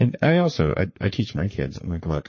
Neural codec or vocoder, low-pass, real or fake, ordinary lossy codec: codec, 44.1 kHz, 3.4 kbps, Pupu-Codec; 7.2 kHz; fake; MP3, 32 kbps